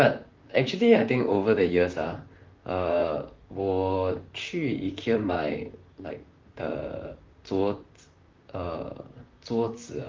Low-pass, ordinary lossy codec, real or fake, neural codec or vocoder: 7.2 kHz; Opus, 32 kbps; fake; vocoder, 44.1 kHz, 128 mel bands, Pupu-Vocoder